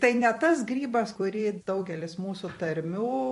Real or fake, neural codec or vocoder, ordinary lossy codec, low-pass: fake; vocoder, 44.1 kHz, 128 mel bands every 256 samples, BigVGAN v2; MP3, 48 kbps; 14.4 kHz